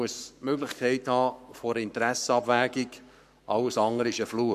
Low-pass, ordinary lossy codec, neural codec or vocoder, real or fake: 14.4 kHz; none; codec, 44.1 kHz, 7.8 kbps, Pupu-Codec; fake